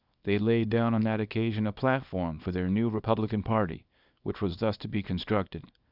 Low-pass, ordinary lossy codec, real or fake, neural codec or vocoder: 5.4 kHz; Opus, 64 kbps; fake; codec, 24 kHz, 0.9 kbps, WavTokenizer, small release